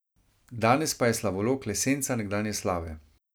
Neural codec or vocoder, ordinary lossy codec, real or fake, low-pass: none; none; real; none